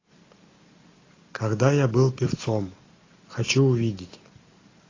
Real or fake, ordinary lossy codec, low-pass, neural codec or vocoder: real; AAC, 32 kbps; 7.2 kHz; none